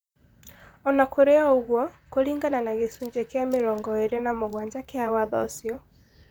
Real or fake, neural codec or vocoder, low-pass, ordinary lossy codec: fake; vocoder, 44.1 kHz, 128 mel bands, Pupu-Vocoder; none; none